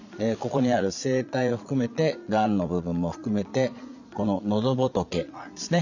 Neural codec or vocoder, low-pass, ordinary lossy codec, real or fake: vocoder, 22.05 kHz, 80 mel bands, Vocos; 7.2 kHz; none; fake